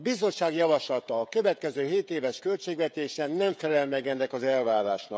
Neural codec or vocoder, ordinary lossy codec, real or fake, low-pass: codec, 16 kHz, 16 kbps, FreqCodec, smaller model; none; fake; none